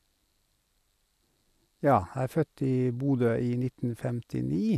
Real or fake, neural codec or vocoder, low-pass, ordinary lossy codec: real; none; 14.4 kHz; none